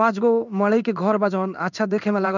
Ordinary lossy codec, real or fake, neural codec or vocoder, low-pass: none; fake; codec, 16 kHz in and 24 kHz out, 1 kbps, XY-Tokenizer; 7.2 kHz